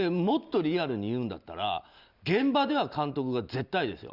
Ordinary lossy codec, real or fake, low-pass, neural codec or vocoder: Opus, 64 kbps; real; 5.4 kHz; none